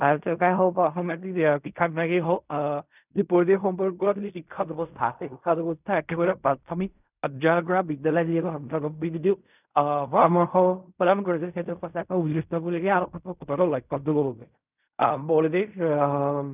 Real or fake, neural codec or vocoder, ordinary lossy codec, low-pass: fake; codec, 16 kHz in and 24 kHz out, 0.4 kbps, LongCat-Audio-Codec, fine tuned four codebook decoder; none; 3.6 kHz